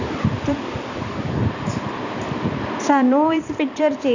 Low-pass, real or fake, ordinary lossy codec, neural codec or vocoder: 7.2 kHz; fake; none; vocoder, 44.1 kHz, 128 mel bands every 256 samples, BigVGAN v2